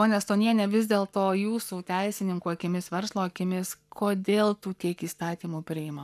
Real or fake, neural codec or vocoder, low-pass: fake; codec, 44.1 kHz, 7.8 kbps, Pupu-Codec; 14.4 kHz